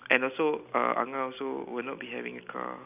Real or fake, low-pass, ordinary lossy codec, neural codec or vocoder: real; 3.6 kHz; none; none